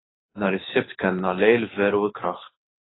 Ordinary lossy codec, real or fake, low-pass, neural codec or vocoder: AAC, 16 kbps; fake; 7.2 kHz; codec, 24 kHz, 6 kbps, HILCodec